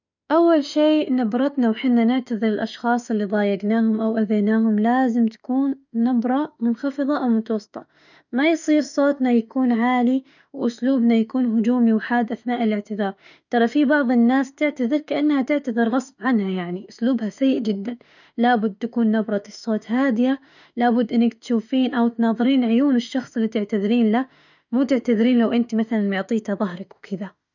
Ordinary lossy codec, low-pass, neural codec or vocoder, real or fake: none; 7.2 kHz; autoencoder, 48 kHz, 32 numbers a frame, DAC-VAE, trained on Japanese speech; fake